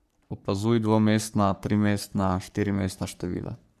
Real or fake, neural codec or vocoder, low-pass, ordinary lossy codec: fake; codec, 44.1 kHz, 3.4 kbps, Pupu-Codec; 14.4 kHz; none